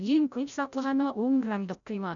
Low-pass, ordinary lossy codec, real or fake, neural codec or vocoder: 7.2 kHz; none; fake; codec, 16 kHz, 0.5 kbps, FreqCodec, larger model